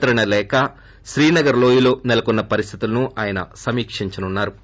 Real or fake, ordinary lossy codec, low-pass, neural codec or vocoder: real; none; none; none